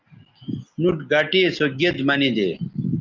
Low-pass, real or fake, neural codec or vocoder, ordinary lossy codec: 7.2 kHz; real; none; Opus, 32 kbps